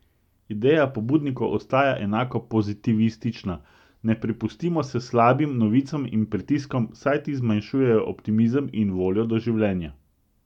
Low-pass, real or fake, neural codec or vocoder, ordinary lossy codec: 19.8 kHz; real; none; none